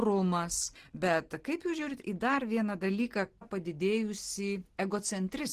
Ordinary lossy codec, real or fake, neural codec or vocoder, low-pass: Opus, 16 kbps; real; none; 14.4 kHz